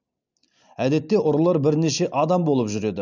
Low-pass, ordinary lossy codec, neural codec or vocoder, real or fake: 7.2 kHz; none; none; real